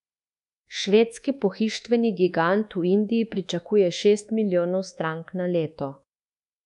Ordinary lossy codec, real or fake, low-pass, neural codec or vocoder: none; fake; 10.8 kHz; codec, 24 kHz, 1.2 kbps, DualCodec